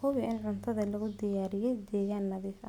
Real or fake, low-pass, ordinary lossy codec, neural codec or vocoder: real; 19.8 kHz; none; none